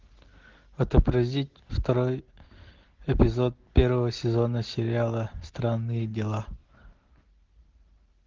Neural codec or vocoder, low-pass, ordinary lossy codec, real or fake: none; 7.2 kHz; Opus, 16 kbps; real